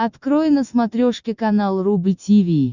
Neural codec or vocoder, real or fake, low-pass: none; real; 7.2 kHz